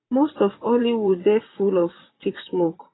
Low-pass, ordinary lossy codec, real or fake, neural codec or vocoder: 7.2 kHz; AAC, 16 kbps; fake; vocoder, 44.1 kHz, 128 mel bands, Pupu-Vocoder